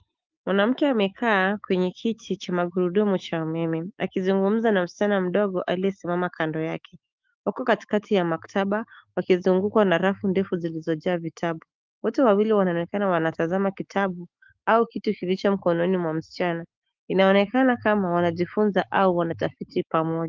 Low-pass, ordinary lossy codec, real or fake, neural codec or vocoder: 7.2 kHz; Opus, 32 kbps; fake; autoencoder, 48 kHz, 128 numbers a frame, DAC-VAE, trained on Japanese speech